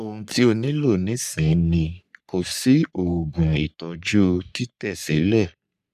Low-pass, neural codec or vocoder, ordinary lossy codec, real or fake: 14.4 kHz; codec, 44.1 kHz, 3.4 kbps, Pupu-Codec; none; fake